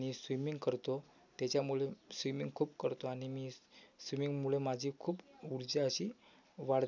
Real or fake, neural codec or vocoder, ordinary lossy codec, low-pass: real; none; none; 7.2 kHz